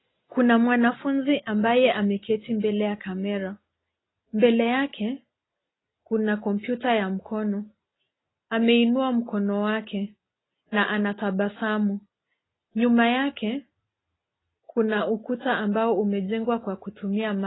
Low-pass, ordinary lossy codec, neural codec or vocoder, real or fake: 7.2 kHz; AAC, 16 kbps; none; real